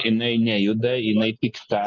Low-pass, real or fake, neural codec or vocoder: 7.2 kHz; real; none